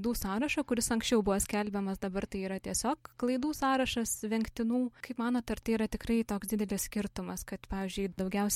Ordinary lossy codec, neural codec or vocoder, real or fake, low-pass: MP3, 64 kbps; none; real; 19.8 kHz